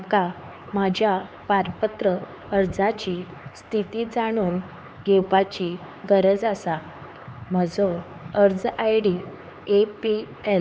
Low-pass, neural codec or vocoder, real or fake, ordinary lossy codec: none; codec, 16 kHz, 4 kbps, X-Codec, HuBERT features, trained on LibriSpeech; fake; none